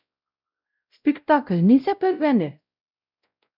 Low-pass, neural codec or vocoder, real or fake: 5.4 kHz; codec, 16 kHz, 0.5 kbps, X-Codec, WavLM features, trained on Multilingual LibriSpeech; fake